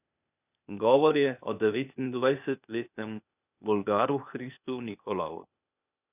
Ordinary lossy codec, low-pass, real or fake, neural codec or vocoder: none; 3.6 kHz; fake; codec, 16 kHz, 0.8 kbps, ZipCodec